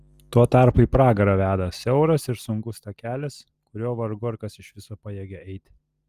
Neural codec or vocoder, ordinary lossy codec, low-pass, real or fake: none; Opus, 32 kbps; 14.4 kHz; real